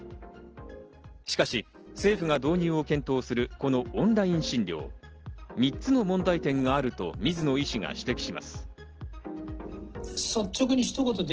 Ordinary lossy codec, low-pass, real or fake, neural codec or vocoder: Opus, 16 kbps; 7.2 kHz; real; none